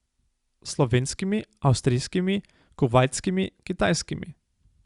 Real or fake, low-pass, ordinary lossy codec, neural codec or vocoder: real; 10.8 kHz; Opus, 64 kbps; none